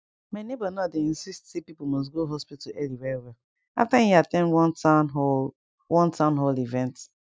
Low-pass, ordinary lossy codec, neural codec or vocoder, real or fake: none; none; none; real